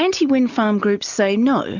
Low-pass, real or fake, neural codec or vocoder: 7.2 kHz; real; none